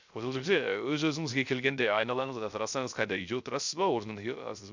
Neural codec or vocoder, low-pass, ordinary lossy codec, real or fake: codec, 16 kHz, 0.3 kbps, FocalCodec; 7.2 kHz; MP3, 64 kbps; fake